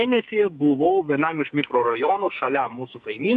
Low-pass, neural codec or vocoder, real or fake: 10.8 kHz; codec, 44.1 kHz, 2.6 kbps, SNAC; fake